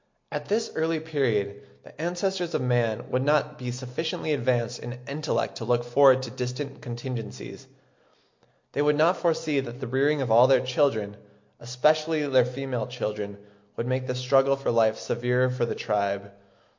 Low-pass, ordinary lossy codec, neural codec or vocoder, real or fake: 7.2 kHz; MP3, 48 kbps; none; real